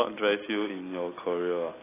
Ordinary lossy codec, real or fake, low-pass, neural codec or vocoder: AAC, 16 kbps; real; 3.6 kHz; none